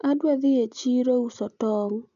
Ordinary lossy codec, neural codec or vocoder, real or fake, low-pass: none; none; real; 7.2 kHz